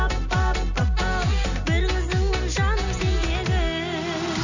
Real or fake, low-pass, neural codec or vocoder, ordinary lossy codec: real; 7.2 kHz; none; none